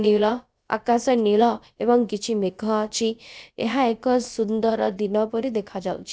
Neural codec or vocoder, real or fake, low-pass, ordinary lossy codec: codec, 16 kHz, about 1 kbps, DyCAST, with the encoder's durations; fake; none; none